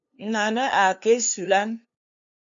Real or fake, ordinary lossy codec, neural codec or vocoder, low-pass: fake; MP3, 64 kbps; codec, 16 kHz, 2 kbps, FunCodec, trained on LibriTTS, 25 frames a second; 7.2 kHz